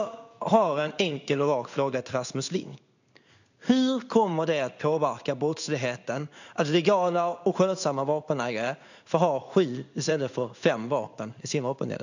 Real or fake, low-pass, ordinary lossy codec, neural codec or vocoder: fake; 7.2 kHz; none; codec, 16 kHz in and 24 kHz out, 1 kbps, XY-Tokenizer